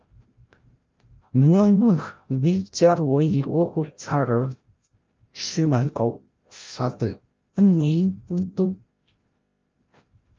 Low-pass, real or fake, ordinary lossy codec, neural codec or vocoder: 7.2 kHz; fake; Opus, 24 kbps; codec, 16 kHz, 0.5 kbps, FreqCodec, larger model